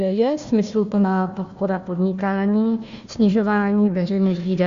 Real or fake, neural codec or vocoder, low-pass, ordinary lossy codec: fake; codec, 16 kHz, 1 kbps, FunCodec, trained on Chinese and English, 50 frames a second; 7.2 kHz; Opus, 64 kbps